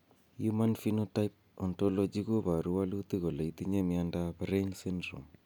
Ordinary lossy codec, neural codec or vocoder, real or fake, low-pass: none; none; real; none